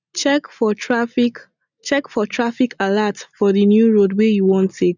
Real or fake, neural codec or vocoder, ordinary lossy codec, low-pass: real; none; none; 7.2 kHz